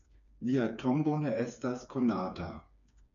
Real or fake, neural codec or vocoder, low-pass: fake; codec, 16 kHz, 4 kbps, FreqCodec, smaller model; 7.2 kHz